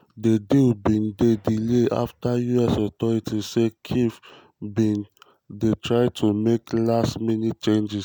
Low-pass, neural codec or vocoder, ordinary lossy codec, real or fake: none; none; none; real